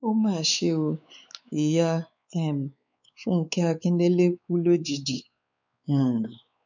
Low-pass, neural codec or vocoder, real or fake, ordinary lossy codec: 7.2 kHz; codec, 16 kHz, 4 kbps, X-Codec, WavLM features, trained on Multilingual LibriSpeech; fake; none